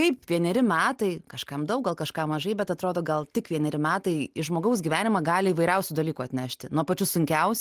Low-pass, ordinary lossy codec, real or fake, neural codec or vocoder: 14.4 kHz; Opus, 24 kbps; real; none